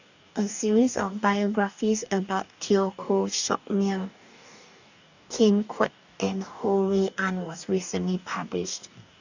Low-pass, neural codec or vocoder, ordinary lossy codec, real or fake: 7.2 kHz; codec, 44.1 kHz, 2.6 kbps, DAC; none; fake